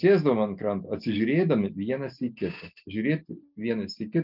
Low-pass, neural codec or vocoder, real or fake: 5.4 kHz; none; real